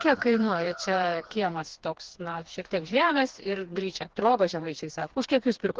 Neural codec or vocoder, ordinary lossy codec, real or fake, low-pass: codec, 16 kHz, 2 kbps, FreqCodec, smaller model; Opus, 16 kbps; fake; 7.2 kHz